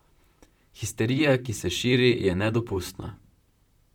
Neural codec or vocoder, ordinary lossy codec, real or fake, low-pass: vocoder, 44.1 kHz, 128 mel bands, Pupu-Vocoder; none; fake; 19.8 kHz